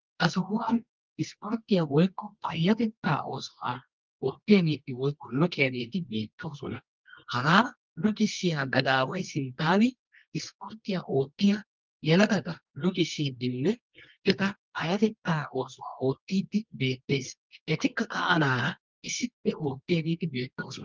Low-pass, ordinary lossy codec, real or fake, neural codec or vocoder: 7.2 kHz; Opus, 32 kbps; fake; codec, 24 kHz, 0.9 kbps, WavTokenizer, medium music audio release